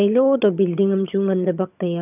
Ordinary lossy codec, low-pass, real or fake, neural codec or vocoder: none; 3.6 kHz; fake; vocoder, 22.05 kHz, 80 mel bands, HiFi-GAN